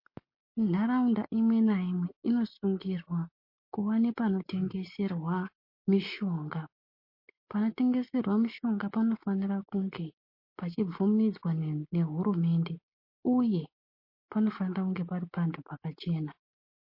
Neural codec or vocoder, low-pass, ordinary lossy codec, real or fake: none; 5.4 kHz; MP3, 32 kbps; real